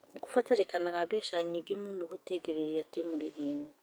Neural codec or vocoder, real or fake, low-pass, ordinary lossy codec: codec, 44.1 kHz, 2.6 kbps, SNAC; fake; none; none